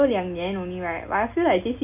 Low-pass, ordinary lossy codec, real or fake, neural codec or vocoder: 3.6 kHz; AAC, 32 kbps; real; none